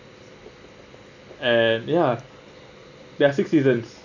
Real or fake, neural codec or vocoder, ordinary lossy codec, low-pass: real; none; none; 7.2 kHz